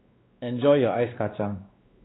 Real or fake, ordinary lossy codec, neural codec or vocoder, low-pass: fake; AAC, 16 kbps; codec, 16 kHz, 2 kbps, X-Codec, WavLM features, trained on Multilingual LibriSpeech; 7.2 kHz